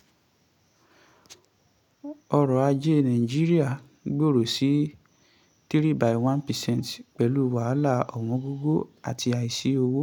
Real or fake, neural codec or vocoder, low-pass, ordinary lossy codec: real; none; 19.8 kHz; none